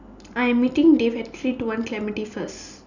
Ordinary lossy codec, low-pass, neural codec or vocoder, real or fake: none; 7.2 kHz; none; real